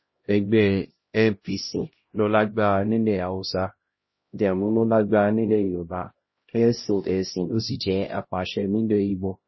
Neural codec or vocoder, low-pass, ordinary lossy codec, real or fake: codec, 16 kHz, 0.5 kbps, X-Codec, HuBERT features, trained on LibriSpeech; 7.2 kHz; MP3, 24 kbps; fake